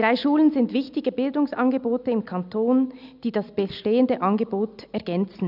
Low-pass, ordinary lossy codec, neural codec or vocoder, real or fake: 5.4 kHz; none; none; real